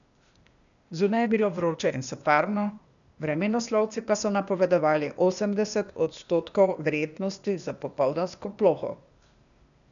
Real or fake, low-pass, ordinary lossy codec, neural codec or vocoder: fake; 7.2 kHz; none; codec, 16 kHz, 0.8 kbps, ZipCodec